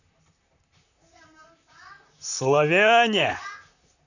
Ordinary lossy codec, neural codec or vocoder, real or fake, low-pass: none; vocoder, 44.1 kHz, 128 mel bands, Pupu-Vocoder; fake; 7.2 kHz